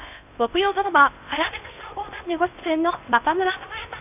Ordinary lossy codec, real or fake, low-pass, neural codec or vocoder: none; fake; 3.6 kHz; codec, 16 kHz in and 24 kHz out, 0.6 kbps, FocalCodec, streaming, 2048 codes